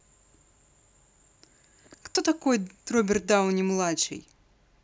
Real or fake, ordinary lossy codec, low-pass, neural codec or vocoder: real; none; none; none